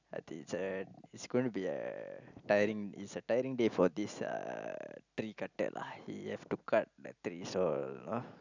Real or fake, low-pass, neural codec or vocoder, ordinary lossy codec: real; 7.2 kHz; none; none